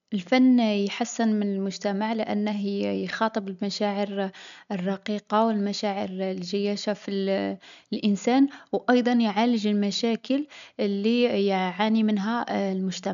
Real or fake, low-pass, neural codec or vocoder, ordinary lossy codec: real; 7.2 kHz; none; none